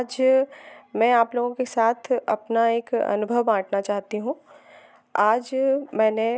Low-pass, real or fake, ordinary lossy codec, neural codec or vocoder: none; real; none; none